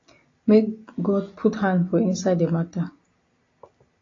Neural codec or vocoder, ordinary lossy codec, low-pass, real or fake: none; AAC, 32 kbps; 7.2 kHz; real